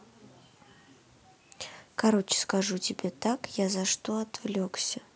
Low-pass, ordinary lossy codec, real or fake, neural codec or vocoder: none; none; real; none